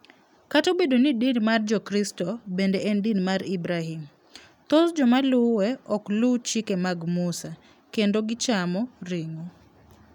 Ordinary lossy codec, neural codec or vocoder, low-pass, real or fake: none; none; 19.8 kHz; real